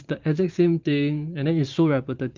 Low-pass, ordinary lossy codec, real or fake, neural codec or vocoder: 7.2 kHz; Opus, 24 kbps; fake; vocoder, 44.1 kHz, 128 mel bands every 512 samples, BigVGAN v2